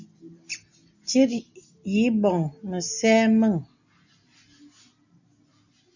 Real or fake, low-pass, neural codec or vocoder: real; 7.2 kHz; none